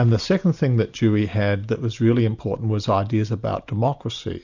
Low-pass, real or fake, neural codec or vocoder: 7.2 kHz; real; none